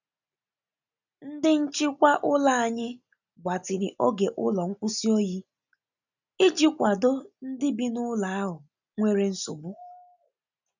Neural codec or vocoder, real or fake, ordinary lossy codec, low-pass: none; real; none; 7.2 kHz